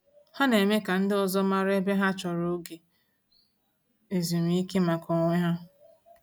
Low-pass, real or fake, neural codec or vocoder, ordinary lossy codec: none; real; none; none